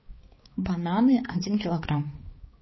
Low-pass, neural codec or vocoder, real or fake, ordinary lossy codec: 7.2 kHz; codec, 16 kHz, 4 kbps, X-Codec, HuBERT features, trained on balanced general audio; fake; MP3, 24 kbps